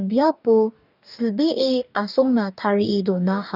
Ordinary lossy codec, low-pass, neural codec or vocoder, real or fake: none; 5.4 kHz; codec, 44.1 kHz, 2.6 kbps, DAC; fake